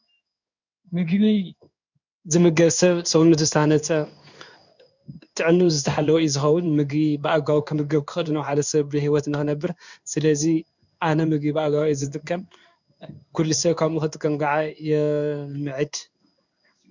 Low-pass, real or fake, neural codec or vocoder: 7.2 kHz; fake; codec, 16 kHz in and 24 kHz out, 1 kbps, XY-Tokenizer